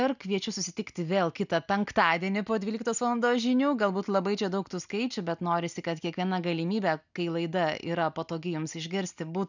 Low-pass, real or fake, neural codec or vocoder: 7.2 kHz; real; none